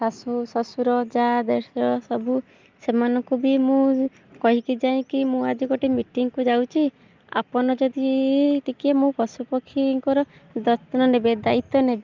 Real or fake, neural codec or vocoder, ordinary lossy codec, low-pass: real; none; Opus, 24 kbps; 7.2 kHz